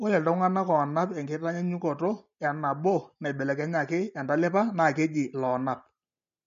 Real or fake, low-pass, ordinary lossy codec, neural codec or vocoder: real; 7.2 kHz; MP3, 48 kbps; none